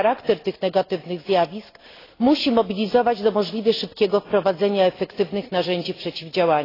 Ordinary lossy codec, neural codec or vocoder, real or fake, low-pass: AAC, 24 kbps; none; real; 5.4 kHz